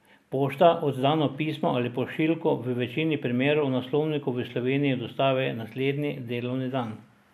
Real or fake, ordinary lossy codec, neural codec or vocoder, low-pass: real; none; none; 14.4 kHz